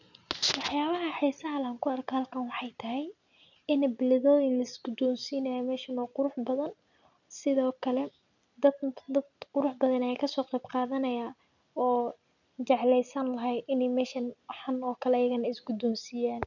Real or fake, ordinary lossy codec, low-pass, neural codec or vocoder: real; none; 7.2 kHz; none